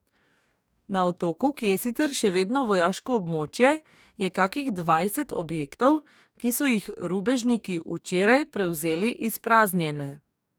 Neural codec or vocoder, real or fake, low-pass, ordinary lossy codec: codec, 44.1 kHz, 2.6 kbps, DAC; fake; none; none